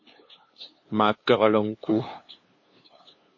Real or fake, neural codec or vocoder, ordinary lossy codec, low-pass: fake; codec, 16 kHz, 2 kbps, FunCodec, trained on LibriTTS, 25 frames a second; MP3, 32 kbps; 7.2 kHz